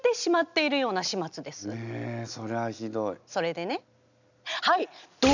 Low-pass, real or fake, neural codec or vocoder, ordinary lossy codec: 7.2 kHz; real; none; none